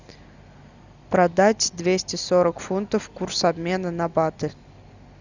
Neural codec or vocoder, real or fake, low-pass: none; real; 7.2 kHz